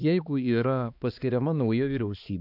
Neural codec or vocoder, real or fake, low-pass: codec, 16 kHz, 2 kbps, X-Codec, HuBERT features, trained on balanced general audio; fake; 5.4 kHz